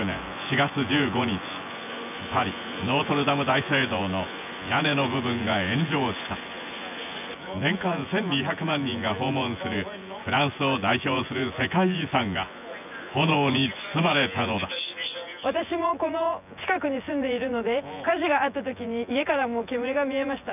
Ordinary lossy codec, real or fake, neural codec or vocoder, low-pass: none; fake; vocoder, 24 kHz, 100 mel bands, Vocos; 3.6 kHz